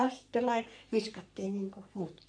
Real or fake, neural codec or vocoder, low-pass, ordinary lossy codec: fake; codec, 44.1 kHz, 3.4 kbps, Pupu-Codec; 9.9 kHz; none